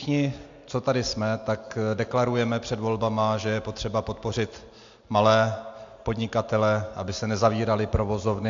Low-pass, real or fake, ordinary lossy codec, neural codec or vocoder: 7.2 kHz; real; AAC, 64 kbps; none